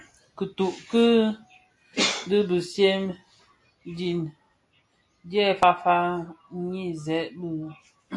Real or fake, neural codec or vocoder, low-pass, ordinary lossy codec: real; none; 10.8 kHz; AAC, 48 kbps